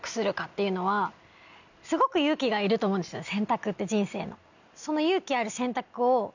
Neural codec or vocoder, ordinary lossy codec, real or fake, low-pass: none; none; real; 7.2 kHz